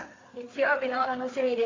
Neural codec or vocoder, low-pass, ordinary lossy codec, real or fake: codec, 16 kHz, 4 kbps, FreqCodec, larger model; 7.2 kHz; AAC, 32 kbps; fake